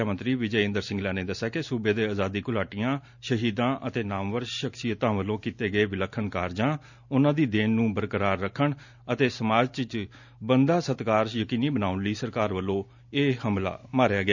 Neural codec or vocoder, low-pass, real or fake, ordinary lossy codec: none; 7.2 kHz; real; none